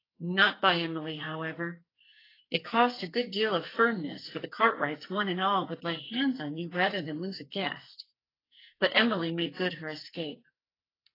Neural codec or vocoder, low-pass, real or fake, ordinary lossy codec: codec, 44.1 kHz, 2.6 kbps, SNAC; 5.4 kHz; fake; AAC, 24 kbps